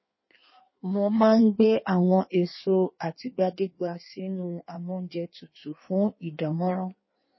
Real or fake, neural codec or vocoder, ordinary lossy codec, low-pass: fake; codec, 16 kHz in and 24 kHz out, 1.1 kbps, FireRedTTS-2 codec; MP3, 24 kbps; 7.2 kHz